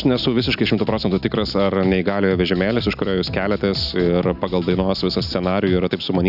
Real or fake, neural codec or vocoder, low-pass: real; none; 5.4 kHz